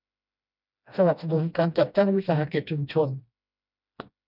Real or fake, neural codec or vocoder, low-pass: fake; codec, 16 kHz, 1 kbps, FreqCodec, smaller model; 5.4 kHz